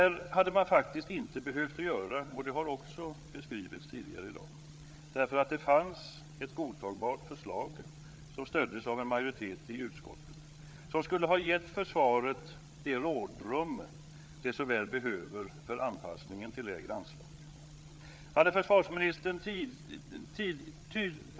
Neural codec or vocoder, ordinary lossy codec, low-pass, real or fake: codec, 16 kHz, 8 kbps, FreqCodec, larger model; none; none; fake